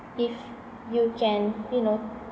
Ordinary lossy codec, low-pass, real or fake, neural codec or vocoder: none; none; real; none